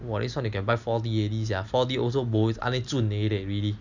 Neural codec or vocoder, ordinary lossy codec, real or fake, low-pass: none; none; real; 7.2 kHz